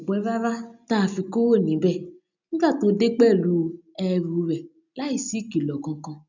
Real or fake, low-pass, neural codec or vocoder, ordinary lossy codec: real; 7.2 kHz; none; none